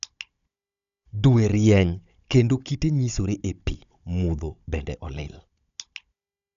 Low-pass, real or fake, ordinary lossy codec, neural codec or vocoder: 7.2 kHz; fake; none; codec, 16 kHz, 16 kbps, FunCodec, trained on Chinese and English, 50 frames a second